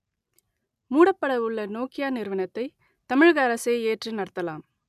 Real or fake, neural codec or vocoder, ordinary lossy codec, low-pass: real; none; none; 14.4 kHz